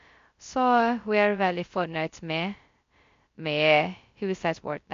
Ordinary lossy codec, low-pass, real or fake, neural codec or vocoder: MP3, 48 kbps; 7.2 kHz; fake; codec, 16 kHz, 0.2 kbps, FocalCodec